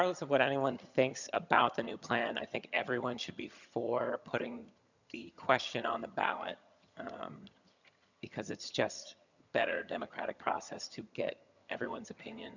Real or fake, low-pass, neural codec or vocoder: fake; 7.2 kHz; vocoder, 22.05 kHz, 80 mel bands, HiFi-GAN